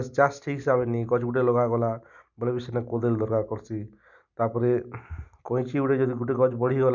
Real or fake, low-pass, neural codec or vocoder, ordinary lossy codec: real; 7.2 kHz; none; none